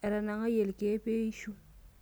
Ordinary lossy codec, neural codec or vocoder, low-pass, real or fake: none; none; none; real